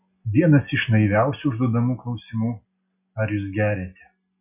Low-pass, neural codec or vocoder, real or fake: 3.6 kHz; none; real